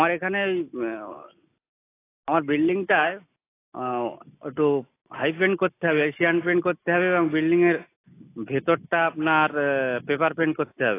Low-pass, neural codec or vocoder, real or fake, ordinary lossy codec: 3.6 kHz; none; real; AAC, 24 kbps